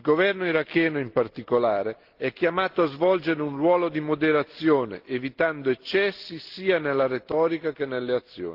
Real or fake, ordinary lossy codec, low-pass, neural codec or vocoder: real; Opus, 16 kbps; 5.4 kHz; none